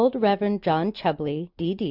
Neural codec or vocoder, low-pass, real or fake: none; 5.4 kHz; real